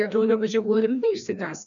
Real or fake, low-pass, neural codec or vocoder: fake; 7.2 kHz; codec, 16 kHz, 1 kbps, FreqCodec, larger model